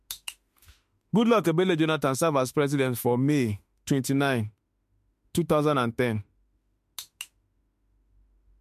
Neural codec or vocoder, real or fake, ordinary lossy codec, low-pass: autoencoder, 48 kHz, 32 numbers a frame, DAC-VAE, trained on Japanese speech; fake; MP3, 64 kbps; 14.4 kHz